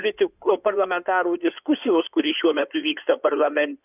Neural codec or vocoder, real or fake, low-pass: codec, 16 kHz in and 24 kHz out, 2.2 kbps, FireRedTTS-2 codec; fake; 3.6 kHz